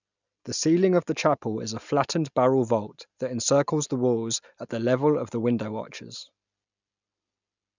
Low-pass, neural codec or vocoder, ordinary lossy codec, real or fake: 7.2 kHz; none; none; real